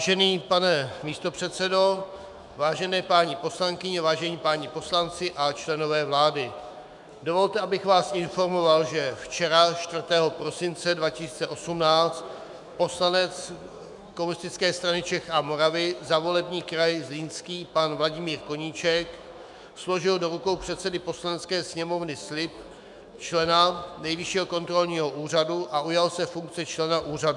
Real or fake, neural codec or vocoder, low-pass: fake; autoencoder, 48 kHz, 128 numbers a frame, DAC-VAE, trained on Japanese speech; 10.8 kHz